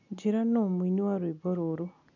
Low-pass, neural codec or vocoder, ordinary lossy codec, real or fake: 7.2 kHz; none; none; real